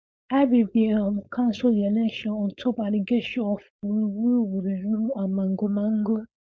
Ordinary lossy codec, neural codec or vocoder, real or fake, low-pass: none; codec, 16 kHz, 4.8 kbps, FACodec; fake; none